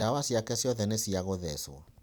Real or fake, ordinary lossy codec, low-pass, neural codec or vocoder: fake; none; none; vocoder, 44.1 kHz, 128 mel bands every 256 samples, BigVGAN v2